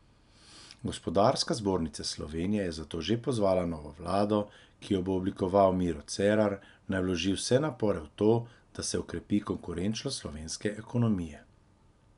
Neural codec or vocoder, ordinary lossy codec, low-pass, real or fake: none; none; 10.8 kHz; real